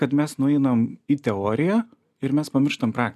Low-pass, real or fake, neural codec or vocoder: 14.4 kHz; real; none